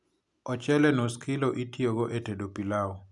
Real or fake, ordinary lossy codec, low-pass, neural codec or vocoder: real; none; 10.8 kHz; none